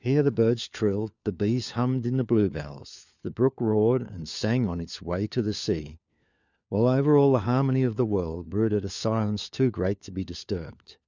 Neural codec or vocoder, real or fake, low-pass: codec, 16 kHz, 4 kbps, FunCodec, trained on LibriTTS, 50 frames a second; fake; 7.2 kHz